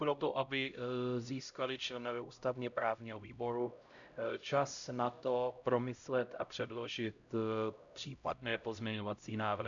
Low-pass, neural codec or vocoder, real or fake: 7.2 kHz; codec, 16 kHz, 0.5 kbps, X-Codec, HuBERT features, trained on LibriSpeech; fake